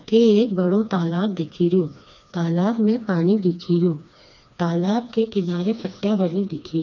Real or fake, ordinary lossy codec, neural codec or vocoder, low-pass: fake; none; codec, 16 kHz, 2 kbps, FreqCodec, smaller model; 7.2 kHz